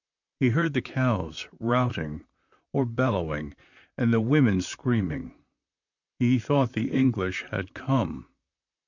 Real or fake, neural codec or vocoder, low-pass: fake; vocoder, 44.1 kHz, 128 mel bands, Pupu-Vocoder; 7.2 kHz